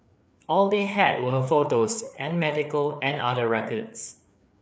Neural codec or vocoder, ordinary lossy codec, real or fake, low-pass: codec, 16 kHz, 4 kbps, FreqCodec, larger model; none; fake; none